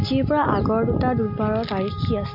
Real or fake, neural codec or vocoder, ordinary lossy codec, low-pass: real; none; MP3, 32 kbps; 5.4 kHz